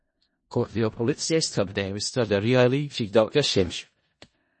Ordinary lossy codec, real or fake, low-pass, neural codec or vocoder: MP3, 32 kbps; fake; 10.8 kHz; codec, 16 kHz in and 24 kHz out, 0.4 kbps, LongCat-Audio-Codec, four codebook decoder